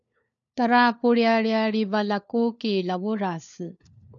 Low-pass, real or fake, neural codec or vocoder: 7.2 kHz; fake; codec, 16 kHz, 4 kbps, FunCodec, trained on LibriTTS, 50 frames a second